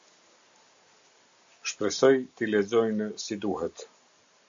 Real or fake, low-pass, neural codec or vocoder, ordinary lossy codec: real; 7.2 kHz; none; AAC, 64 kbps